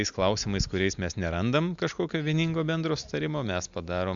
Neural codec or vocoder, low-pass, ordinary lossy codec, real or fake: none; 7.2 kHz; MP3, 64 kbps; real